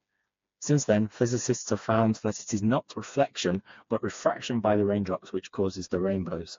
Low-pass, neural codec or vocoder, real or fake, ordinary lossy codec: 7.2 kHz; codec, 16 kHz, 2 kbps, FreqCodec, smaller model; fake; AAC, 48 kbps